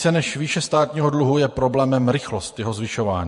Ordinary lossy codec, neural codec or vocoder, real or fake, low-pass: MP3, 48 kbps; vocoder, 48 kHz, 128 mel bands, Vocos; fake; 14.4 kHz